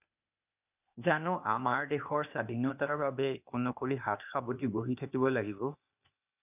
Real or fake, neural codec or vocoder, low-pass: fake; codec, 16 kHz, 0.8 kbps, ZipCodec; 3.6 kHz